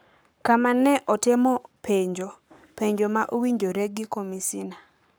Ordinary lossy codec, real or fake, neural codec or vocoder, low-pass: none; fake; codec, 44.1 kHz, 7.8 kbps, Pupu-Codec; none